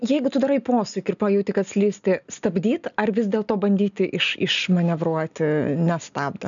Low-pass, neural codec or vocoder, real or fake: 7.2 kHz; none; real